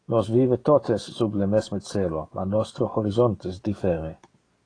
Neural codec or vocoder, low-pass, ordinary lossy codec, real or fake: codec, 44.1 kHz, 7.8 kbps, DAC; 9.9 kHz; AAC, 32 kbps; fake